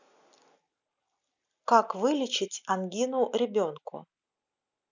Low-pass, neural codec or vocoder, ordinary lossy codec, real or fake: 7.2 kHz; none; none; real